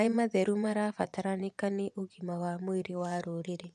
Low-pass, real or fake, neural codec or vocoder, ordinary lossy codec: none; fake; vocoder, 24 kHz, 100 mel bands, Vocos; none